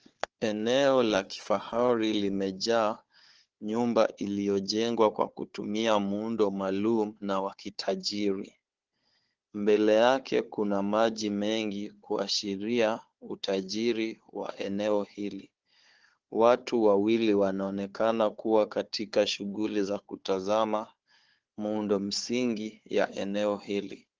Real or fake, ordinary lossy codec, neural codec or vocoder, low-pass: fake; Opus, 16 kbps; codec, 16 kHz, 4 kbps, FunCodec, trained on Chinese and English, 50 frames a second; 7.2 kHz